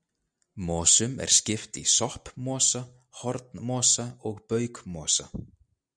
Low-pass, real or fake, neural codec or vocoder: 9.9 kHz; real; none